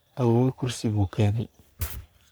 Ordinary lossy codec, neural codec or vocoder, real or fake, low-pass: none; codec, 44.1 kHz, 3.4 kbps, Pupu-Codec; fake; none